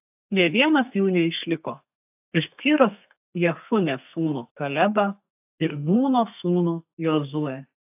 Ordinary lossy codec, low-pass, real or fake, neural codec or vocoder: AAC, 32 kbps; 3.6 kHz; fake; codec, 32 kHz, 1.9 kbps, SNAC